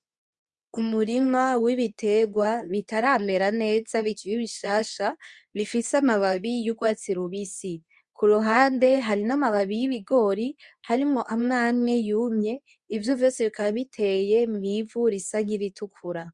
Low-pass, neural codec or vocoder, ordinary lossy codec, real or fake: 10.8 kHz; codec, 24 kHz, 0.9 kbps, WavTokenizer, medium speech release version 2; Opus, 64 kbps; fake